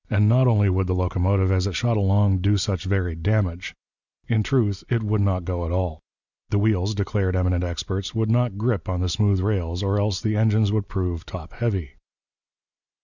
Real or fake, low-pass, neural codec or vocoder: real; 7.2 kHz; none